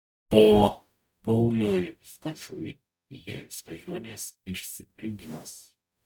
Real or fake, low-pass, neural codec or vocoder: fake; 19.8 kHz; codec, 44.1 kHz, 0.9 kbps, DAC